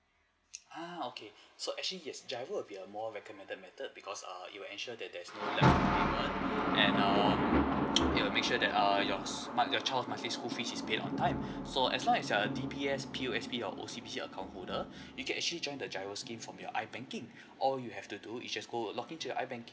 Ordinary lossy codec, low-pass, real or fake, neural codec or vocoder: none; none; real; none